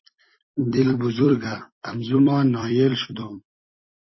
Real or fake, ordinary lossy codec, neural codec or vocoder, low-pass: fake; MP3, 24 kbps; vocoder, 44.1 kHz, 128 mel bands every 256 samples, BigVGAN v2; 7.2 kHz